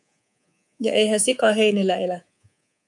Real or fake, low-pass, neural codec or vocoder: fake; 10.8 kHz; codec, 24 kHz, 3.1 kbps, DualCodec